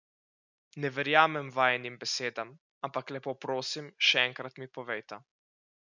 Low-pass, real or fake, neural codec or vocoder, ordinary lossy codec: 7.2 kHz; real; none; none